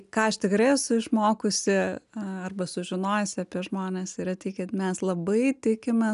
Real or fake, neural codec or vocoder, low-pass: real; none; 10.8 kHz